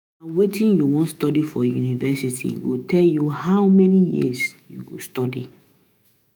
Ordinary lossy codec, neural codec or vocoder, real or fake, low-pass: none; autoencoder, 48 kHz, 128 numbers a frame, DAC-VAE, trained on Japanese speech; fake; none